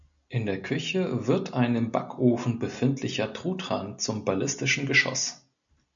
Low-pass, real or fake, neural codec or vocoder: 7.2 kHz; real; none